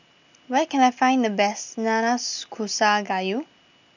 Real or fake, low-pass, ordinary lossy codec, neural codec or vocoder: real; 7.2 kHz; none; none